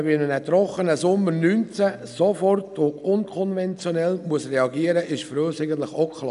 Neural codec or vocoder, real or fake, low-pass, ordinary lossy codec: none; real; 10.8 kHz; none